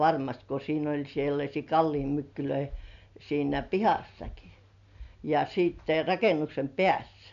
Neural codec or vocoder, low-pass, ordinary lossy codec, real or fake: none; 7.2 kHz; none; real